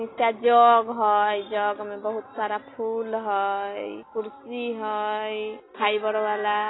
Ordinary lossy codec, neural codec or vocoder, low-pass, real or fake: AAC, 16 kbps; none; 7.2 kHz; real